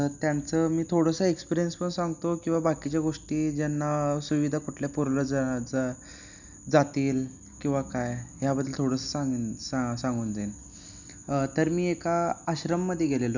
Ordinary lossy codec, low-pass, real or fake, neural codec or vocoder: none; 7.2 kHz; real; none